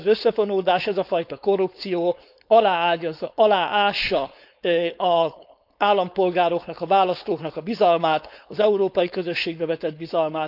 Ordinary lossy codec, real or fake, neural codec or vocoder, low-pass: none; fake; codec, 16 kHz, 4.8 kbps, FACodec; 5.4 kHz